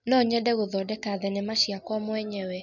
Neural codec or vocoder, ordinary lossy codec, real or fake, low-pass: none; none; real; 7.2 kHz